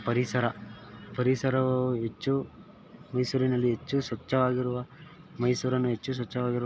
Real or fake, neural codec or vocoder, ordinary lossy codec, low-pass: real; none; none; none